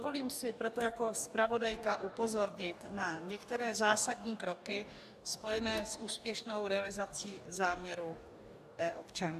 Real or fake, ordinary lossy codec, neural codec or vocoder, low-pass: fake; AAC, 96 kbps; codec, 44.1 kHz, 2.6 kbps, DAC; 14.4 kHz